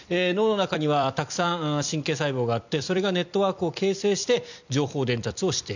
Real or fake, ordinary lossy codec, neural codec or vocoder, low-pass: fake; none; vocoder, 44.1 kHz, 128 mel bands every 256 samples, BigVGAN v2; 7.2 kHz